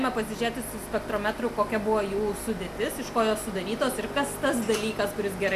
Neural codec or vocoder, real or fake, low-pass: none; real; 14.4 kHz